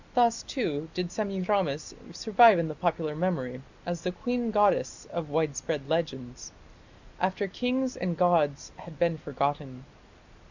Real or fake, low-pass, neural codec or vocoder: real; 7.2 kHz; none